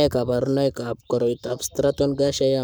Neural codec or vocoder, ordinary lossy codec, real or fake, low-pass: codec, 44.1 kHz, 7.8 kbps, Pupu-Codec; none; fake; none